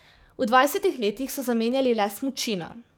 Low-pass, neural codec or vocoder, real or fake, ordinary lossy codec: none; codec, 44.1 kHz, 7.8 kbps, DAC; fake; none